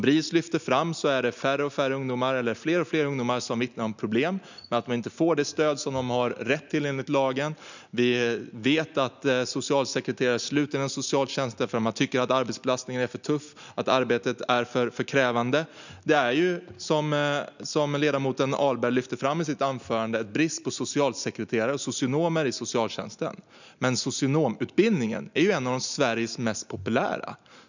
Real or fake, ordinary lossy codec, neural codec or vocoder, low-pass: real; none; none; 7.2 kHz